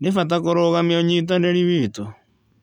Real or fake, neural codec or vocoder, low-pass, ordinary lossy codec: real; none; 19.8 kHz; none